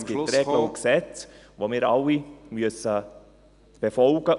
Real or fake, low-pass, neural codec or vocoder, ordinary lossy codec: real; 10.8 kHz; none; none